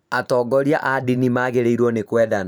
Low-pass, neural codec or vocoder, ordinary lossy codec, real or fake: none; vocoder, 44.1 kHz, 128 mel bands every 512 samples, BigVGAN v2; none; fake